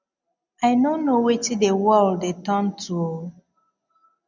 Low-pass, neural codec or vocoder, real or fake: 7.2 kHz; none; real